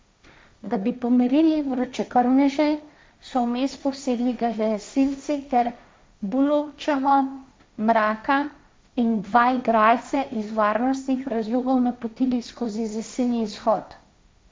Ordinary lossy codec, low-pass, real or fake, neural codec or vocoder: none; none; fake; codec, 16 kHz, 1.1 kbps, Voila-Tokenizer